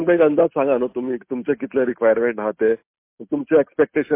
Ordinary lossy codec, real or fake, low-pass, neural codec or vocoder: MP3, 24 kbps; real; 3.6 kHz; none